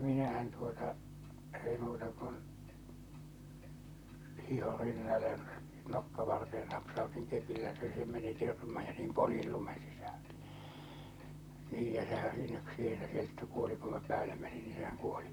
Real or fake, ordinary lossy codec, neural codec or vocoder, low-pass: fake; none; vocoder, 44.1 kHz, 128 mel bands, Pupu-Vocoder; none